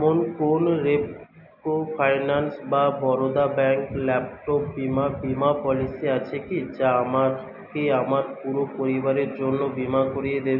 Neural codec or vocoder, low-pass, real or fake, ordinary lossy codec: none; 5.4 kHz; real; none